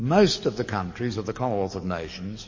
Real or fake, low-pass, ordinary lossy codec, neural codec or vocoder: fake; 7.2 kHz; MP3, 32 kbps; codec, 44.1 kHz, 7.8 kbps, DAC